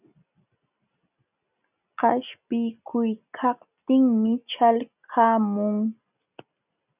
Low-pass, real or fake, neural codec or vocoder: 3.6 kHz; real; none